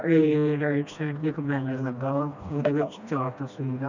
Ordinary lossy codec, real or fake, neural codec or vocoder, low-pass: none; fake; codec, 16 kHz, 1 kbps, FreqCodec, smaller model; 7.2 kHz